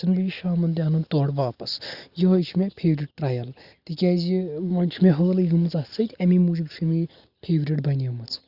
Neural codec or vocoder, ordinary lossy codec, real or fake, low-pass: none; Opus, 64 kbps; real; 5.4 kHz